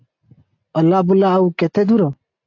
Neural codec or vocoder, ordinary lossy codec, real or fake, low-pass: none; AAC, 48 kbps; real; 7.2 kHz